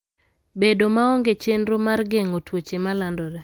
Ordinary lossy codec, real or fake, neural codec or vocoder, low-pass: Opus, 32 kbps; real; none; 19.8 kHz